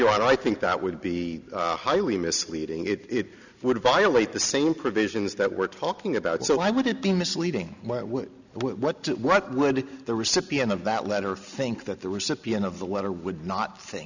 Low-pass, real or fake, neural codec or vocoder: 7.2 kHz; real; none